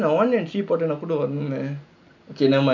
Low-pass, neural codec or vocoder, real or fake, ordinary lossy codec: 7.2 kHz; none; real; none